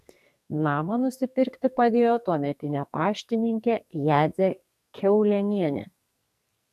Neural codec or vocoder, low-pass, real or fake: codec, 44.1 kHz, 2.6 kbps, SNAC; 14.4 kHz; fake